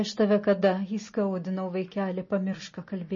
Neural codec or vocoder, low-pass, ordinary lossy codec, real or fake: none; 7.2 kHz; MP3, 32 kbps; real